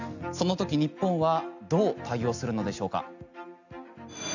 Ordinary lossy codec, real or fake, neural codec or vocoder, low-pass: none; real; none; 7.2 kHz